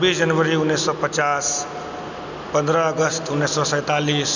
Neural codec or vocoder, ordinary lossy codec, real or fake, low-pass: none; none; real; 7.2 kHz